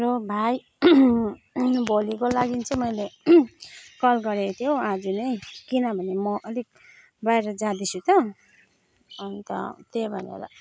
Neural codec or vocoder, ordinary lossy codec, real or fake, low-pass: none; none; real; none